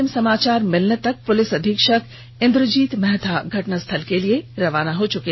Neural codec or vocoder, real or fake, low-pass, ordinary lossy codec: none; real; 7.2 kHz; MP3, 24 kbps